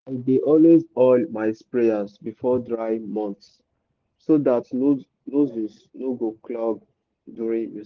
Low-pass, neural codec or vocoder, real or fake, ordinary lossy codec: 7.2 kHz; none; real; Opus, 16 kbps